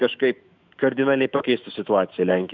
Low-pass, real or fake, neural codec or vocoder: 7.2 kHz; fake; autoencoder, 48 kHz, 128 numbers a frame, DAC-VAE, trained on Japanese speech